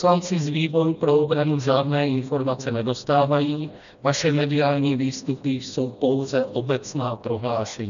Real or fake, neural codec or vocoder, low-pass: fake; codec, 16 kHz, 1 kbps, FreqCodec, smaller model; 7.2 kHz